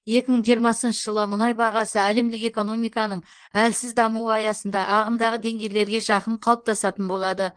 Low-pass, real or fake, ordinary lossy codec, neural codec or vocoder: 9.9 kHz; fake; Opus, 24 kbps; codec, 16 kHz in and 24 kHz out, 1.1 kbps, FireRedTTS-2 codec